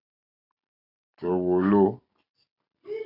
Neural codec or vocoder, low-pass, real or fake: none; 5.4 kHz; real